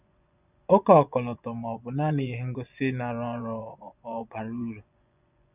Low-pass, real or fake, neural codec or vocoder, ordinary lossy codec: 3.6 kHz; fake; vocoder, 24 kHz, 100 mel bands, Vocos; none